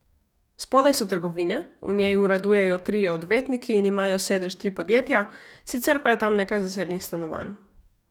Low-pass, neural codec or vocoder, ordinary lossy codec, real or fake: 19.8 kHz; codec, 44.1 kHz, 2.6 kbps, DAC; none; fake